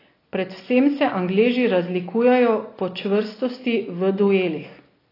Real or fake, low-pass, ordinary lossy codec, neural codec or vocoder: real; 5.4 kHz; AAC, 24 kbps; none